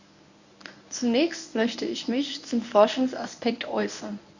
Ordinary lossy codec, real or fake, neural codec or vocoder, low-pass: none; fake; codec, 24 kHz, 0.9 kbps, WavTokenizer, medium speech release version 1; 7.2 kHz